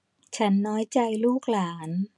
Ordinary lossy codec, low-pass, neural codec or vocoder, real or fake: none; 10.8 kHz; none; real